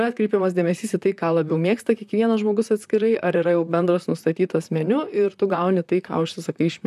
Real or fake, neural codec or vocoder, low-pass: fake; vocoder, 44.1 kHz, 128 mel bands, Pupu-Vocoder; 14.4 kHz